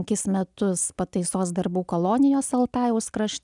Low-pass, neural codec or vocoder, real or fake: 10.8 kHz; none; real